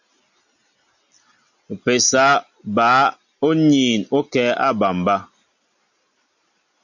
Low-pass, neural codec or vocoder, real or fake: 7.2 kHz; none; real